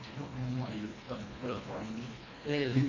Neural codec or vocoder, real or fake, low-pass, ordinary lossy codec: codec, 24 kHz, 1.5 kbps, HILCodec; fake; 7.2 kHz; AAC, 32 kbps